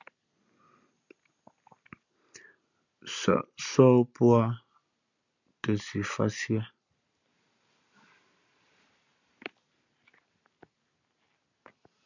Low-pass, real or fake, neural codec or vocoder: 7.2 kHz; real; none